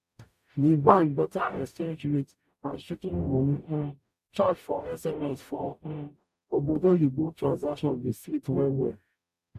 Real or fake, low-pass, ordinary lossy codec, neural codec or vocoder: fake; 14.4 kHz; none; codec, 44.1 kHz, 0.9 kbps, DAC